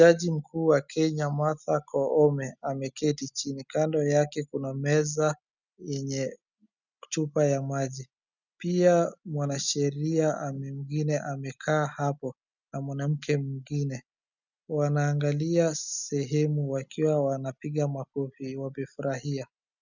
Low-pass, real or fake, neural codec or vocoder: 7.2 kHz; real; none